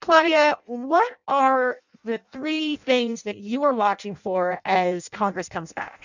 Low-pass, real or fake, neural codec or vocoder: 7.2 kHz; fake; codec, 16 kHz in and 24 kHz out, 0.6 kbps, FireRedTTS-2 codec